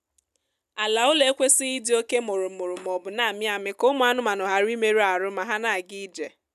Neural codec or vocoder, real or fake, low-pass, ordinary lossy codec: none; real; 14.4 kHz; none